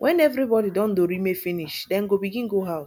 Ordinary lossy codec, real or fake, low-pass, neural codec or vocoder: MP3, 96 kbps; real; 19.8 kHz; none